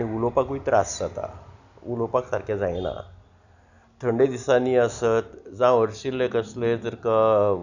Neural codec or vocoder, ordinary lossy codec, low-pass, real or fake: none; none; 7.2 kHz; real